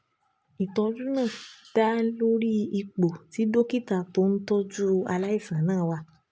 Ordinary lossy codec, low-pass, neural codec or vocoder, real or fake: none; none; none; real